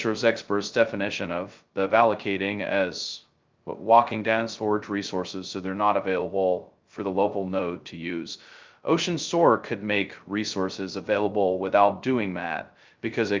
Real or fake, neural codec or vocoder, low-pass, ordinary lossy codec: fake; codec, 16 kHz, 0.2 kbps, FocalCodec; 7.2 kHz; Opus, 24 kbps